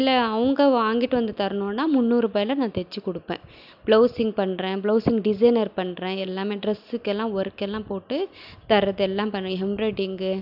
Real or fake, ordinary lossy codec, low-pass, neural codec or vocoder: real; none; 5.4 kHz; none